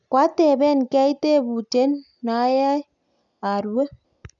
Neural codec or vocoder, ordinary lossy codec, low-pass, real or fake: none; none; 7.2 kHz; real